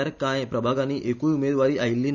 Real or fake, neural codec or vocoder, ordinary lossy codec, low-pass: real; none; none; 7.2 kHz